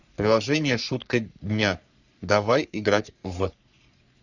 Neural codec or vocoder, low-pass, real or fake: codec, 44.1 kHz, 3.4 kbps, Pupu-Codec; 7.2 kHz; fake